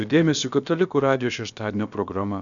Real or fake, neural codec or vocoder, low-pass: fake; codec, 16 kHz, about 1 kbps, DyCAST, with the encoder's durations; 7.2 kHz